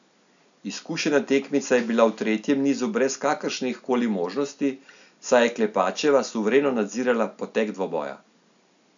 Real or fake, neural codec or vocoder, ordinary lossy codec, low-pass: real; none; none; 7.2 kHz